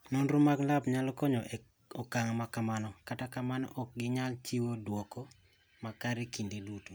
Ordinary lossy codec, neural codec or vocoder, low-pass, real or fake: none; none; none; real